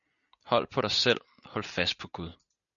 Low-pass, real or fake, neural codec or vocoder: 7.2 kHz; real; none